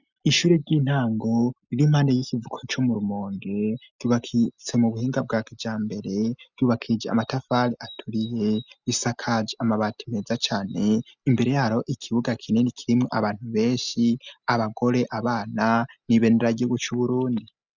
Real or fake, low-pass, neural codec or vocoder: real; 7.2 kHz; none